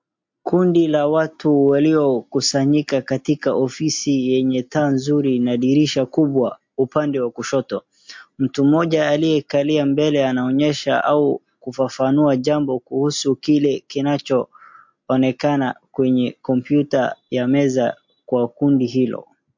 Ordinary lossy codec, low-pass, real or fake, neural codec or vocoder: MP3, 48 kbps; 7.2 kHz; real; none